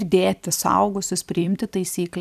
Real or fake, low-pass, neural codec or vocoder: fake; 14.4 kHz; vocoder, 44.1 kHz, 128 mel bands every 256 samples, BigVGAN v2